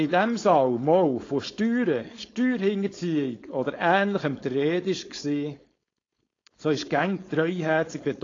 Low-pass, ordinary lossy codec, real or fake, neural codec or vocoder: 7.2 kHz; AAC, 32 kbps; fake; codec, 16 kHz, 4.8 kbps, FACodec